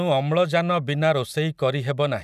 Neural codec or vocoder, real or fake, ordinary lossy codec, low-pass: none; real; none; 14.4 kHz